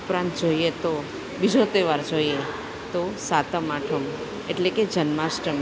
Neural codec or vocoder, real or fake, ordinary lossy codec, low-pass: none; real; none; none